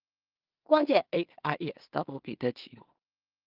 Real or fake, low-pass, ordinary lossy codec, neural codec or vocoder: fake; 5.4 kHz; Opus, 32 kbps; codec, 16 kHz in and 24 kHz out, 0.4 kbps, LongCat-Audio-Codec, two codebook decoder